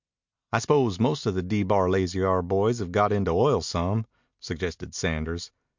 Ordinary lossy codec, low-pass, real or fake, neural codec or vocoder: MP3, 64 kbps; 7.2 kHz; real; none